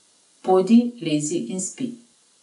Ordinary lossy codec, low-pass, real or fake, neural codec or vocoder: none; 10.8 kHz; real; none